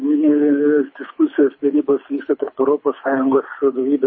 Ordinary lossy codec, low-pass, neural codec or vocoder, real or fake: MP3, 24 kbps; 7.2 kHz; codec, 24 kHz, 6 kbps, HILCodec; fake